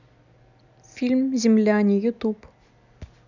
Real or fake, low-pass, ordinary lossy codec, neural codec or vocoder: real; 7.2 kHz; none; none